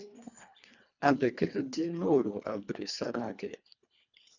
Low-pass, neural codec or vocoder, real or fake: 7.2 kHz; codec, 24 kHz, 1.5 kbps, HILCodec; fake